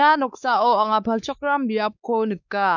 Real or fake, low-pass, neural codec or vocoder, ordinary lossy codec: fake; 7.2 kHz; codec, 16 kHz, 4 kbps, X-Codec, WavLM features, trained on Multilingual LibriSpeech; none